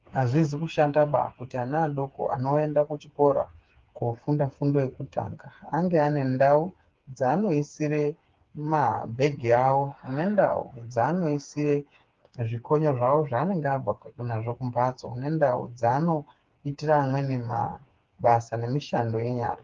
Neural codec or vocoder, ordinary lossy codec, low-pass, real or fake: codec, 16 kHz, 4 kbps, FreqCodec, smaller model; Opus, 32 kbps; 7.2 kHz; fake